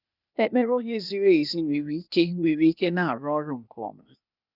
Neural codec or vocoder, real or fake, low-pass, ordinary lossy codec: codec, 16 kHz, 0.8 kbps, ZipCodec; fake; 5.4 kHz; none